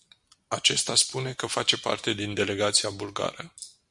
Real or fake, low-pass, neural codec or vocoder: real; 10.8 kHz; none